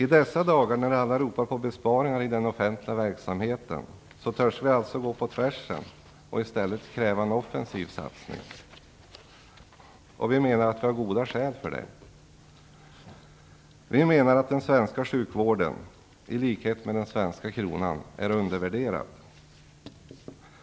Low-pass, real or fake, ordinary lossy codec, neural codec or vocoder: none; real; none; none